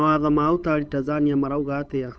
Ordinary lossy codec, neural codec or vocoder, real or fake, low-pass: none; codec, 16 kHz, 8 kbps, FunCodec, trained on Chinese and English, 25 frames a second; fake; none